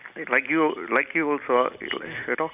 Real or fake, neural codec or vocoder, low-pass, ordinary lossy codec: real; none; 3.6 kHz; none